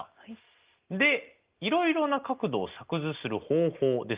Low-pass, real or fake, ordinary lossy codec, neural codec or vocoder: 3.6 kHz; real; Opus, 32 kbps; none